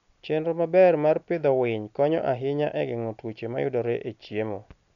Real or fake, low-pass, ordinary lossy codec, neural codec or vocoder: real; 7.2 kHz; none; none